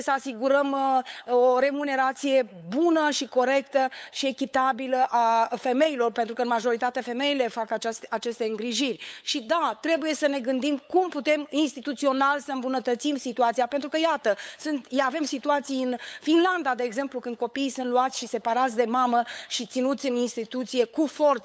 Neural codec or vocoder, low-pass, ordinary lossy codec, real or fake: codec, 16 kHz, 8 kbps, FunCodec, trained on LibriTTS, 25 frames a second; none; none; fake